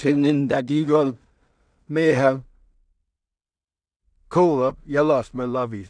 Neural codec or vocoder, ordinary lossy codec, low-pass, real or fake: codec, 16 kHz in and 24 kHz out, 0.4 kbps, LongCat-Audio-Codec, two codebook decoder; none; 9.9 kHz; fake